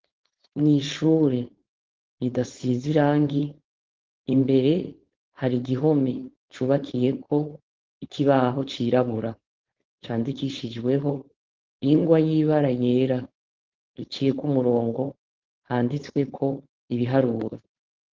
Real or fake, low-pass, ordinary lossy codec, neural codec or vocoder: fake; 7.2 kHz; Opus, 16 kbps; codec, 16 kHz, 4.8 kbps, FACodec